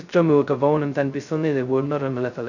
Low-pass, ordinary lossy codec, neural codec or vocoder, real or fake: 7.2 kHz; none; codec, 16 kHz, 0.2 kbps, FocalCodec; fake